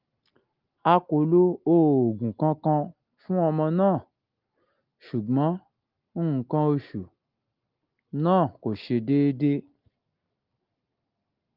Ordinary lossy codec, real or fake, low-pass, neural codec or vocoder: Opus, 32 kbps; real; 5.4 kHz; none